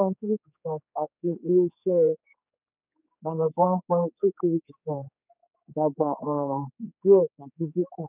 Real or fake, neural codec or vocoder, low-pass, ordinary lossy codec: fake; codec, 16 kHz, 4 kbps, X-Codec, HuBERT features, trained on general audio; 3.6 kHz; none